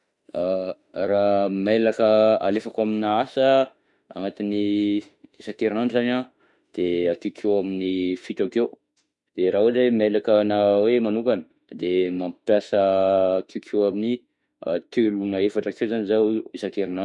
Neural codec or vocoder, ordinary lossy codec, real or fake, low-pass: autoencoder, 48 kHz, 32 numbers a frame, DAC-VAE, trained on Japanese speech; none; fake; 10.8 kHz